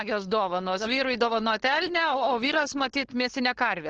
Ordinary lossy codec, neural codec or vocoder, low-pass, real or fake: Opus, 16 kbps; codec, 16 kHz, 4.8 kbps, FACodec; 7.2 kHz; fake